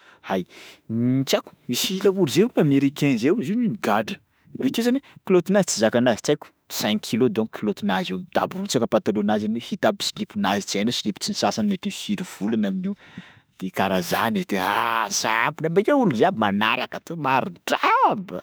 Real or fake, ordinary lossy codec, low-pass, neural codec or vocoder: fake; none; none; autoencoder, 48 kHz, 32 numbers a frame, DAC-VAE, trained on Japanese speech